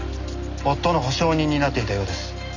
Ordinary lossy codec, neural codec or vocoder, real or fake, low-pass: none; none; real; 7.2 kHz